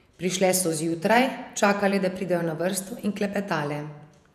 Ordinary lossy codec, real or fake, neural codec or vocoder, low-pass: none; fake; vocoder, 48 kHz, 128 mel bands, Vocos; 14.4 kHz